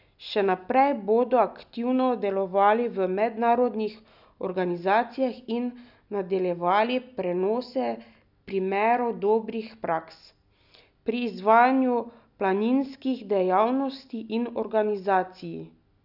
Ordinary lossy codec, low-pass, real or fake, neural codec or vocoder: none; 5.4 kHz; real; none